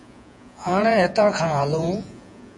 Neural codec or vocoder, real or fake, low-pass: vocoder, 48 kHz, 128 mel bands, Vocos; fake; 10.8 kHz